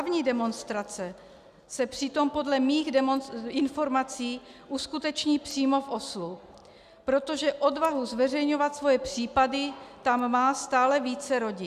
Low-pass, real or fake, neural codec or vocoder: 14.4 kHz; real; none